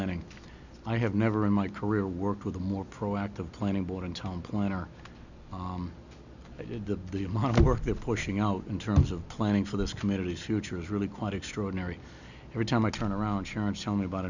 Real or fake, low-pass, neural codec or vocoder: real; 7.2 kHz; none